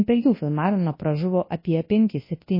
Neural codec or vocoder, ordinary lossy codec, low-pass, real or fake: codec, 24 kHz, 0.9 kbps, WavTokenizer, large speech release; MP3, 24 kbps; 5.4 kHz; fake